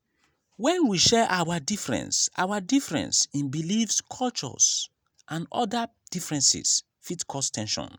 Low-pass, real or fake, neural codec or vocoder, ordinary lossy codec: none; real; none; none